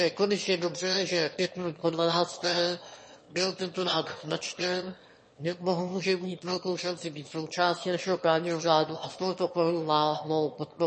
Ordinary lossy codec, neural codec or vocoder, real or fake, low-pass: MP3, 32 kbps; autoencoder, 22.05 kHz, a latent of 192 numbers a frame, VITS, trained on one speaker; fake; 9.9 kHz